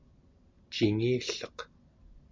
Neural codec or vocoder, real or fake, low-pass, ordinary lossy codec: none; real; 7.2 kHz; MP3, 64 kbps